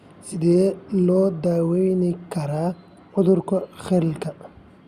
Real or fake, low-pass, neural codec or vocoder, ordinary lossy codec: real; 14.4 kHz; none; Opus, 64 kbps